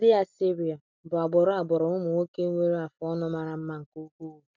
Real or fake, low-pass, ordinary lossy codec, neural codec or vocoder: real; 7.2 kHz; none; none